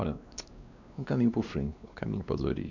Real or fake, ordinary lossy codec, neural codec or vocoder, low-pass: fake; none; codec, 16 kHz, 2 kbps, X-Codec, WavLM features, trained on Multilingual LibriSpeech; 7.2 kHz